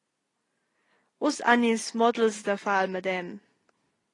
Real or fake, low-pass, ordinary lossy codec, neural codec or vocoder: real; 10.8 kHz; AAC, 32 kbps; none